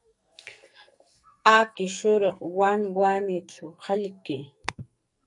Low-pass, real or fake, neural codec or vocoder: 10.8 kHz; fake; codec, 44.1 kHz, 2.6 kbps, SNAC